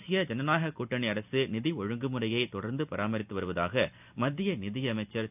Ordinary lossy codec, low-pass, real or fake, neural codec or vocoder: none; 3.6 kHz; real; none